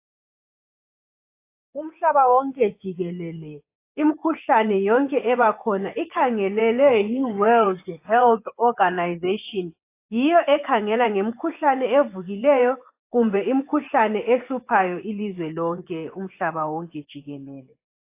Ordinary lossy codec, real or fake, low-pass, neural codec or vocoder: AAC, 24 kbps; fake; 3.6 kHz; vocoder, 24 kHz, 100 mel bands, Vocos